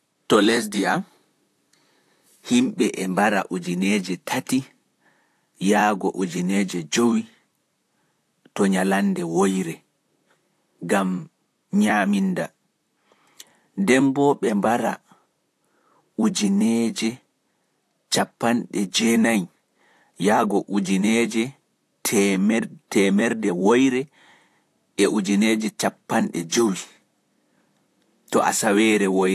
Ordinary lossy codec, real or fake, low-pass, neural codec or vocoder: AAC, 64 kbps; fake; 14.4 kHz; vocoder, 44.1 kHz, 128 mel bands, Pupu-Vocoder